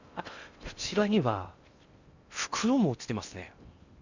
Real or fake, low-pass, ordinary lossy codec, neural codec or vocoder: fake; 7.2 kHz; Opus, 64 kbps; codec, 16 kHz in and 24 kHz out, 0.8 kbps, FocalCodec, streaming, 65536 codes